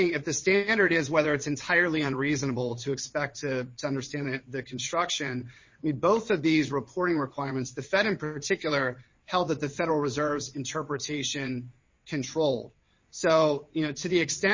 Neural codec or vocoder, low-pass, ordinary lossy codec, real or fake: vocoder, 22.05 kHz, 80 mel bands, WaveNeXt; 7.2 kHz; MP3, 32 kbps; fake